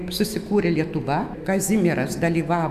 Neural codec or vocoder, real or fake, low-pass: autoencoder, 48 kHz, 128 numbers a frame, DAC-VAE, trained on Japanese speech; fake; 14.4 kHz